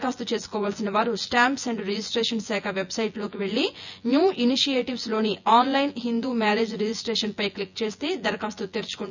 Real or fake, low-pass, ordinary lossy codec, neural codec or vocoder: fake; 7.2 kHz; none; vocoder, 24 kHz, 100 mel bands, Vocos